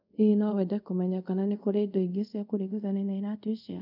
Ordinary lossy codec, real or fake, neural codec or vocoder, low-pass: none; fake; codec, 24 kHz, 0.5 kbps, DualCodec; 5.4 kHz